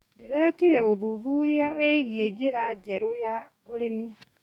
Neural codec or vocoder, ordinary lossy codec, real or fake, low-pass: codec, 44.1 kHz, 2.6 kbps, DAC; none; fake; 19.8 kHz